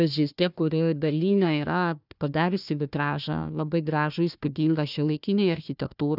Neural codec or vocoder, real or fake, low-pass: codec, 16 kHz, 1 kbps, FunCodec, trained on Chinese and English, 50 frames a second; fake; 5.4 kHz